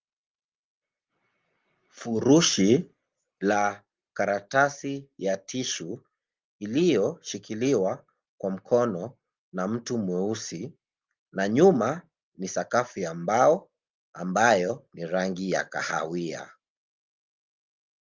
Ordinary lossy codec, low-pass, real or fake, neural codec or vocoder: Opus, 24 kbps; 7.2 kHz; real; none